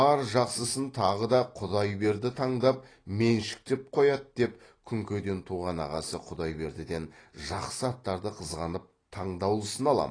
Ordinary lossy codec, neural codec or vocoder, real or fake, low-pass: AAC, 32 kbps; none; real; 9.9 kHz